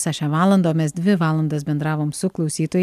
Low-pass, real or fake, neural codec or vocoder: 14.4 kHz; real; none